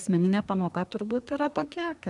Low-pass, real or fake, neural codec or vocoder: 10.8 kHz; fake; codec, 24 kHz, 1 kbps, SNAC